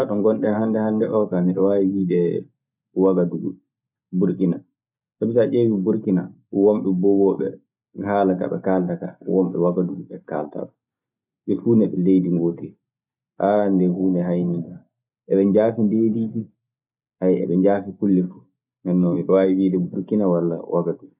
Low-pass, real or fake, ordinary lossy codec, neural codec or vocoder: 3.6 kHz; real; none; none